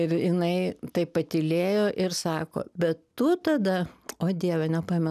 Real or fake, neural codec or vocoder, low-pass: real; none; 14.4 kHz